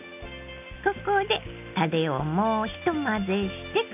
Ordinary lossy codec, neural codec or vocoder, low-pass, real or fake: none; none; 3.6 kHz; real